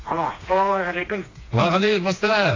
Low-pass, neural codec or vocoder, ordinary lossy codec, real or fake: 7.2 kHz; codec, 16 kHz, 2 kbps, FreqCodec, smaller model; AAC, 32 kbps; fake